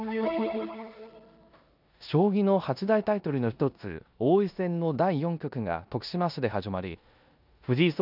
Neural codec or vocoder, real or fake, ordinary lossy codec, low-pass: codec, 16 kHz in and 24 kHz out, 0.9 kbps, LongCat-Audio-Codec, four codebook decoder; fake; none; 5.4 kHz